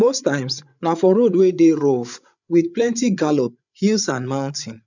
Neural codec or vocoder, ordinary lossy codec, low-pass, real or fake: codec, 16 kHz, 8 kbps, FreqCodec, larger model; none; 7.2 kHz; fake